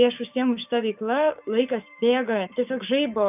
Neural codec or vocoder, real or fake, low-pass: codec, 16 kHz, 6 kbps, DAC; fake; 3.6 kHz